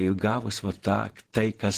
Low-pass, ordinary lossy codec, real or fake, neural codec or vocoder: 14.4 kHz; Opus, 16 kbps; fake; vocoder, 48 kHz, 128 mel bands, Vocos